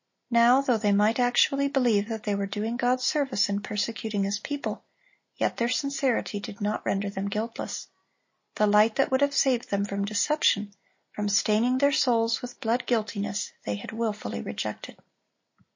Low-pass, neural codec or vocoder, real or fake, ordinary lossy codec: 7.2 kHz; none; real; MP3, 32 kbps